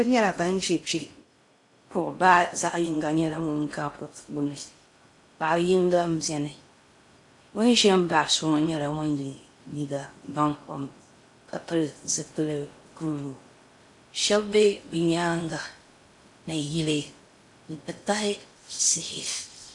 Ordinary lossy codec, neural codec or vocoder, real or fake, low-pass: AAC, 64 kbps; codec, 16 kHz in and 24 kHz out, 0.6 kbps, FocalCodec, streaming, 2048 codes; fake; 10.8 kHz